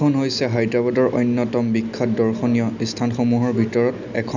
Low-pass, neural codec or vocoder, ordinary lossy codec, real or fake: 7.2 kHz; none; none; real